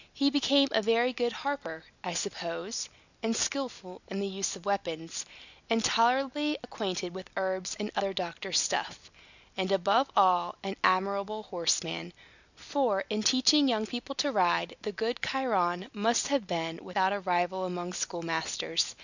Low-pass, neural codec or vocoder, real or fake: 7.2 kHz; none; real